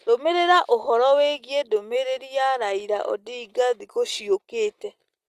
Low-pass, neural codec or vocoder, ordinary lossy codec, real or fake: 14.4 kHz; none; Opus, 24 kbps; real